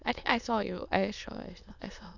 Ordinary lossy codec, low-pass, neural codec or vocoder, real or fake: none; 7.2 kHz; autoencoder, 22.05 kHz, a latent of 192 numbers a frame, VITS, trained on many speakers; fake